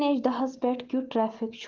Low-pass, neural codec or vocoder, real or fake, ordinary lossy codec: 7.2 kHz; none; real; Opus, 16 kbps